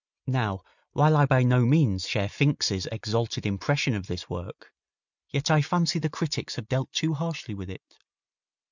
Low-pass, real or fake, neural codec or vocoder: 7.2 kHz; real; none